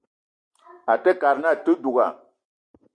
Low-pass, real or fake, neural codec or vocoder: 9.9 kHz; real; none